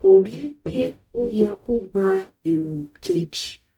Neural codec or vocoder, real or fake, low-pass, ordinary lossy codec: codec, 44.1 kHz, 0.9 kbps, DAC; fake; 19.8 kHz; none